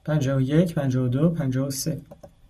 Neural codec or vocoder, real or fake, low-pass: none; real; 14.4 kHz